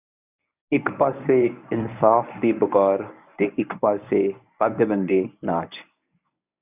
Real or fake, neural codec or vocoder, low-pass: fake; codec, 24 kHz, 0.9 kbps, WavTokenizer, medium speech release version 1; 3.6 kHz